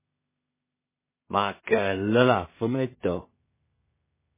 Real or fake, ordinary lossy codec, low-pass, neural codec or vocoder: fake; MP3, 16 kbps; 3.6 kHz; codec, 16 kHz in and 24 kHz out, 0.4 kbps, LongCat-Audio-Codec, two codebook decoder